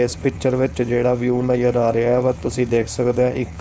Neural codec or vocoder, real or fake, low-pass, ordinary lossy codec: codec, 16 kHz, 4.8 kbps, FACodec; fake; none; none